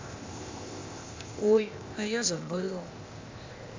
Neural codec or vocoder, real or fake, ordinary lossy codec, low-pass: codec, 16 kHz, 0.8 kbps, ZipCodec; fake; MP3, 48 kbps; 7.2 kHz